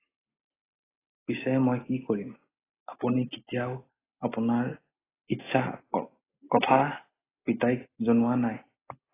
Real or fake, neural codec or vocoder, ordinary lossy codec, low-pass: real; none; AAC, 16 kbps; 3.6 kHz